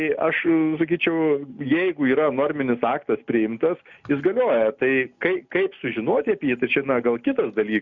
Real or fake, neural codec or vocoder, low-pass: real; none; 7.2 kHz